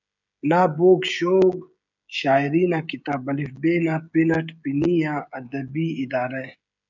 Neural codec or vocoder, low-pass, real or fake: codec, 16 kHz, 16 kbps, FreqCodec, smaller model; 7.2 kHz; fake